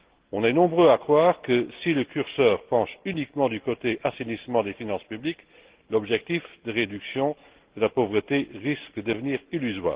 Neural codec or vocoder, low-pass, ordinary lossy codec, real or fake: none; 3.6 kHz; Opus, 16 kbps; real